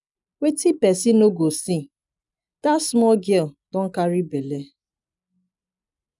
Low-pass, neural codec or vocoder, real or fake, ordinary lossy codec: 10.8 kHz; none; real; none